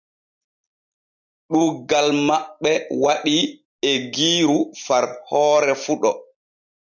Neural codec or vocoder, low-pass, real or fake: none; 7.2 kHz; real